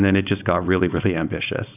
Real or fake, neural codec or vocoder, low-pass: fake; codec, 16 kHz, 4.8 kbps, FACodec; 3.6 kHz